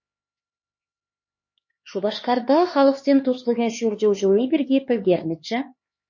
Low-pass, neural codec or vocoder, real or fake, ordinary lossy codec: 7.2 kHz; codec, 16 kHz, 4 kbps, X-Codec, HuBERT features, trained on LibriSpeech; fake; MP3, 32 kbps